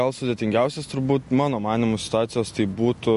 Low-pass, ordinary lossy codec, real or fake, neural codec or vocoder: 14.4 kHz; MP3, 48 kbps; real; none